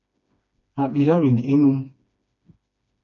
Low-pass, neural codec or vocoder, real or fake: 7.2 kHz; codec, 16 kHz, 2 kbps, FreqCodec, smaller model; fake